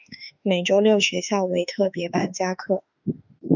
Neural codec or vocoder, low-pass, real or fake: autoencoder, 48 kHz, 32 numbers a frame, DAC-VAE, trained on Japanese speech; 7.2 kHz; fake